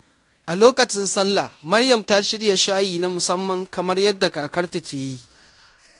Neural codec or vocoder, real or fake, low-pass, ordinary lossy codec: codec, 16 kHz in and 24 kHz out, 0.9 kbps, LongCat-Audio-Codec, fine tuned four codebook decoder; fake; 10.8 kHz; AAC, 48 kbps